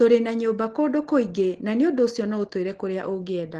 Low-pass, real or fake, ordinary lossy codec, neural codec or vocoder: 10.8 kHz; real; Opus, 16 kbps; none